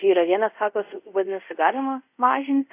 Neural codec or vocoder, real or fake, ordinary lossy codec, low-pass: codec, 24 kHz, 0.5 kbps, DualCodec; fake; AAC, 32 kbps; 3.6 kHz